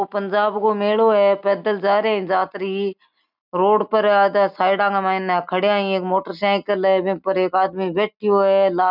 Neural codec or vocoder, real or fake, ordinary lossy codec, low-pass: none; real; none; 5.4 kHz